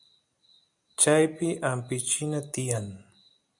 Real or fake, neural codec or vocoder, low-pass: real; none; 10.8 kHz